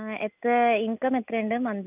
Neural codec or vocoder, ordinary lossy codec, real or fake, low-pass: none; none; real; 3.6 kHz